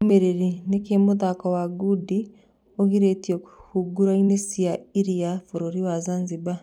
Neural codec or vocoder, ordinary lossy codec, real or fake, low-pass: vocoder, 44.1 kHz, 128 mel bands every 256 samples, BigVGAN v2; none; fake; 19.8 kHz